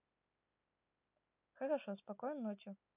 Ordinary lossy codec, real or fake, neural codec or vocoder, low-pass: none; real; none; 3.6 kHz